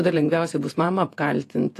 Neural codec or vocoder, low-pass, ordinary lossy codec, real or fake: vocoder, 48 kHz, 128 mel bands, Vocos; 14.4 kHz; AAC, 64 kbps; fake